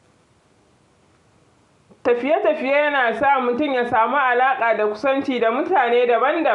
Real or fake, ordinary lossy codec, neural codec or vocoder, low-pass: real; none; none; 10.8 kHz